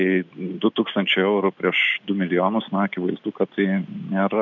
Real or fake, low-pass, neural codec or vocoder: real; 7.2 kHz; none